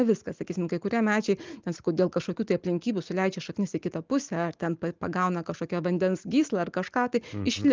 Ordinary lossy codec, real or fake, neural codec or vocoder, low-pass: Opus, 24 kbps; real; none; 7.2 kHz